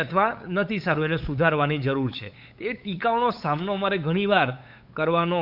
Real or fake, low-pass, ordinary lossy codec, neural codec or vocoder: fake; 5.4 kHz; none; codec, 16 kHz, 8 kbps, FunCodec, trained on LibriTTS, 25 frames a second